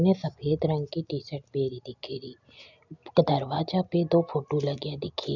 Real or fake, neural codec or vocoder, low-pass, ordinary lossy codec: real; none; 7.2 kHz; none